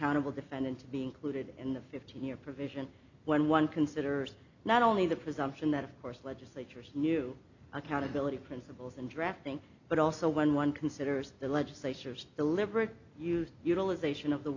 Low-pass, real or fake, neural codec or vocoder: 7.2 kHz; real; none